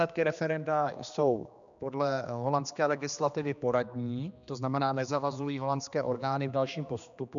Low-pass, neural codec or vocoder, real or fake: 7.2 kHz; codec, 16 kHz, 2 kbps, X-Codec, HuBERT features, trained on general audio; fake